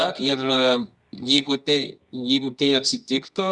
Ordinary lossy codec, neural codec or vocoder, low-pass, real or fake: Opus, 64 kbps; codec, 24 kHz, 0.9 kbps, WavTokenizer, medium music audio release; 10.8 kHz; fake